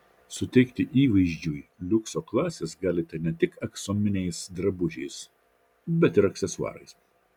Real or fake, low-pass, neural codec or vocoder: real; 19.8 kHz; none